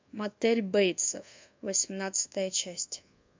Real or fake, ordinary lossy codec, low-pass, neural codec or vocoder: fake; MP3, 64 kbps; 7.2 kHz; codec, 24 kHz, 1.2 kbps, DualCodec